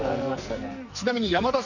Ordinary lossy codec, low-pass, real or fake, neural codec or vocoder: none; 7.2 kHz; fake; codec, 44.1 kHz, 2.6 kbps, SNAC